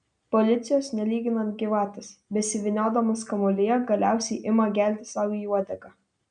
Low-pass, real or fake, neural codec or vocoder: 9.9 kHz; real; none